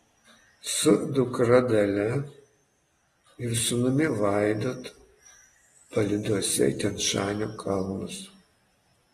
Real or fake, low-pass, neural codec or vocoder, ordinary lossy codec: real; 19.8 kHz; none; AAC, 32 kbps